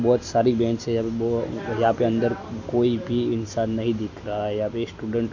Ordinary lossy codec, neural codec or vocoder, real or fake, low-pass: MP3, 64 kbps; none; real; 7.2 kHz